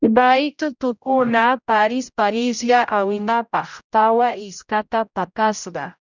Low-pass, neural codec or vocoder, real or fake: 7.2 kHz; codec, 16 kHz, 0.5 kbps, X-Codec, HuBERT features, trained on general audio; fake